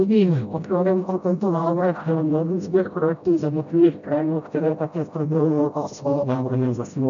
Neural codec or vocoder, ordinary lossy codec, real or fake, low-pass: codec, 16 kHz, 0.5 kbps, FreqCodec, smaller model; AAC, 64 kbps; fake; 7.2 kHz